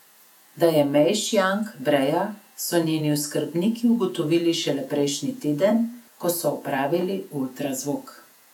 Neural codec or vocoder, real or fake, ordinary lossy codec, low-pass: vocoder, 48 kHz, 128 mel bands, Vocos; fake; none; 19.8 kHz